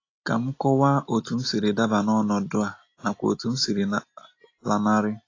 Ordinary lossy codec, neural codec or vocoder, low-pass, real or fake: AAC, 32 kbps; none; 7.2 kHz; real